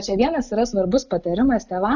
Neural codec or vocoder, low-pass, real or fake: none; 7.2 kHz; real